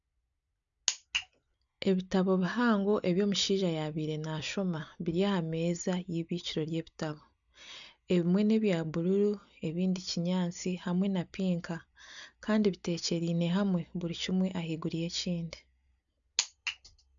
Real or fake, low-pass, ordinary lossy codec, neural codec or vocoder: real; 7.2 kHz; none; none